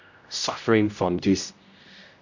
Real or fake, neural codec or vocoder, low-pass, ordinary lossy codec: fake; codec, 16 kHz, 0.5 kbps, X-Codec, HuBERT features, trained on general audio; 7.2 kHz; none